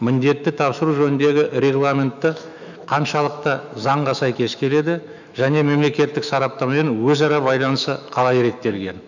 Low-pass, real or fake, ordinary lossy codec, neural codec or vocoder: 7.2 kHz; fake; none; autoencoder, 48 kHz, 128 numbers a frame, DAC-VAE, trained on Japanese speech